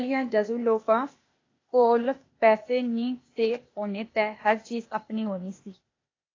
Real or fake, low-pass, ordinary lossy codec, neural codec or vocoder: fake; 7.2 kHz; AAC, 32 kbps; codec, 16 kHz, 0.8 kbps, ZipCodec